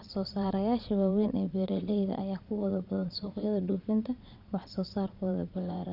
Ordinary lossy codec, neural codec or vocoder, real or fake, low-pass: none; vocoder, 22.05 kHz, 80 mel bands, Vocos; fake; 5.4 kHz